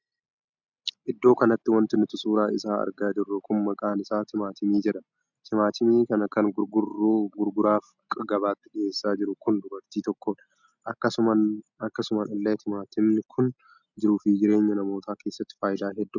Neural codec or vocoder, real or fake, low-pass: none; real; 7.2 kHz